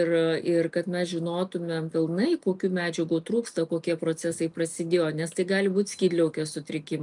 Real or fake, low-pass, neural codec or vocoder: real; 10.8 kHz; none